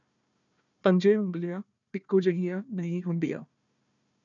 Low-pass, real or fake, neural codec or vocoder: 7.2 kHz; fake; codec, 16 kHz, 1 kbps, FunCodec, trained on Chinese and English, 50 frames a second